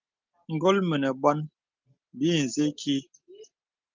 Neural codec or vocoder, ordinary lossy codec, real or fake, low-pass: none; Opus, 32 kbps; real; 7.2 kHz